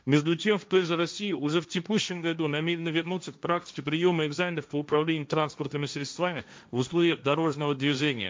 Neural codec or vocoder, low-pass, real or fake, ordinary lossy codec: codec, 16 kHz, 1.1 kbps, Voila-Tokenizer; none; fake; none